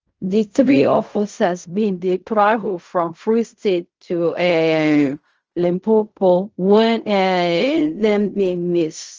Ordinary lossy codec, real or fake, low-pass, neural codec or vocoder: Opus, 24 kbps; fake; 7.2 kHz; codec, 16 kHz in and 24 kHz out, 0.4 kbps, LongCat-Audio-Codec, fine tuned four codebook decoder